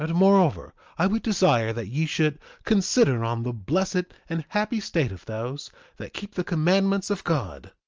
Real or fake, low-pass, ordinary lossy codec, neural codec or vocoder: real; 7.2 kHz; Opus, 24 kbps; none